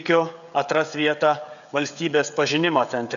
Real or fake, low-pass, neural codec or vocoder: fake; 7.2 kHz; codec, 16 kHz, 16 kbps, FreqCodec, smaller model